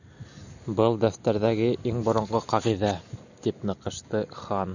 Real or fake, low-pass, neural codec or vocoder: real; 7.2 kHz; none